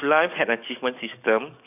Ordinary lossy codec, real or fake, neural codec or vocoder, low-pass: none; fake; codec, 44.1 kHz, 7.8 kbps, DAC; 3.6 kHz